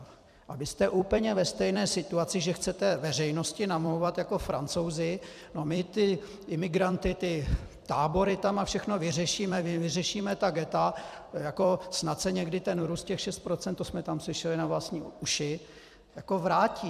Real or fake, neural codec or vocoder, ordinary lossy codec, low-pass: fake; vocoder, 44.1 kHz, 128 mel bands every 256 samples, BigVGAN v2; AAC, 96 kbps; 14.4 kHz